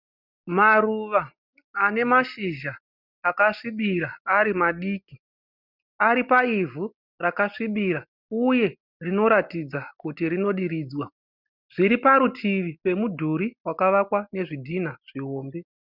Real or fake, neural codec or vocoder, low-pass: real; none; 5.4 kHz